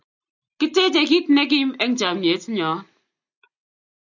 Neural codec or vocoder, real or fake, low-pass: none; real; 7.2 kHz